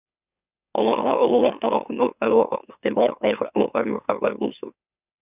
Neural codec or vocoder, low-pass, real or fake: autoencoder, 44.1 kHz, a latent of 192 numbers a frame, MeloTTS; 3.6 kHz; fake